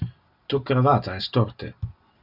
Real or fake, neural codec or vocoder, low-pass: real; none; 5.4 kHz